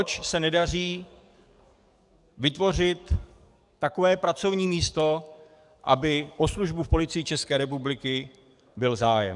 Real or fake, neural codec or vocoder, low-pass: fake; codec, 44.1 kHz, 7.8 kbps, DAC; 10.8 kHz